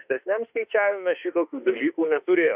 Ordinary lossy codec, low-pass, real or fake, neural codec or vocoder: Opus, 64 kbps; 3.6 kHz; fake; autoencoder, 48 kHz, 32 numbers a frame, DAC-VAE, trained on Japanese speech